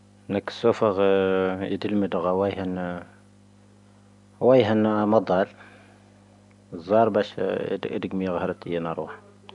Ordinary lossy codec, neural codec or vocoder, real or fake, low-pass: none; none; real; 10.8 kHz